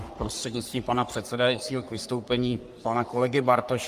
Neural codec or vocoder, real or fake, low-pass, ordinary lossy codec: codec, 44.1 kHz, 3.4 kbps, Pupu-Codec; fake; 14.4 kHz; Opus, 32 kbps